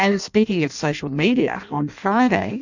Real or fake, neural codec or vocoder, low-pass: fake; codec, 16 kHz in and 24 kHz out, 0.6 kbps, FireRedTTS-2 codec; 7.2 kHz